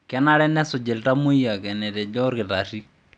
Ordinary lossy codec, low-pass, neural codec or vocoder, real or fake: none; 9.9 kHz; none; real